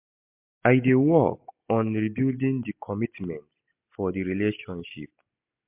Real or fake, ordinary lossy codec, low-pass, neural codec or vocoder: real; MP3, 32 kbps; 3.6 kHz; none